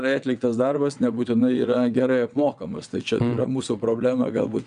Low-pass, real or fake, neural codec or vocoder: 9.9 kHz; fake; vocoder, 22.05 kHz, 80 mel bands, WaveNeXt